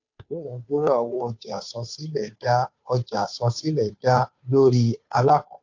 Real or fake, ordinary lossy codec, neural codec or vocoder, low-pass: fake; AAC, 48 kbps; codec, 16 kHz, 2 kbps, FunCodec, trained on Chinese and English, 25 frames a second; 7.2 kHz